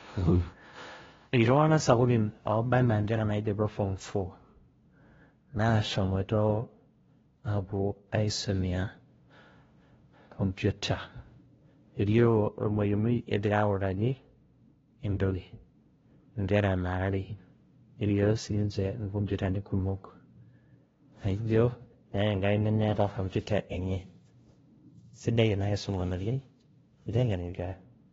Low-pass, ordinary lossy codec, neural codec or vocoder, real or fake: 7.2 kHz; AAC, 24 kbps; codec, 16 kHz, 0.5 kbps, FunCodec, trained on LibriTTS, 25 frames a second; fake